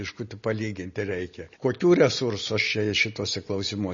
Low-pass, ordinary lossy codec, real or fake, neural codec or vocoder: 7.2 kHz; MP3, 32 kbps; real; none